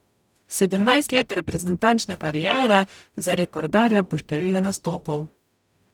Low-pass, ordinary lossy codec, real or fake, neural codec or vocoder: 19.8 kHz; none; fake; codec, 44.1 kHz, 0.9 kbps, DAC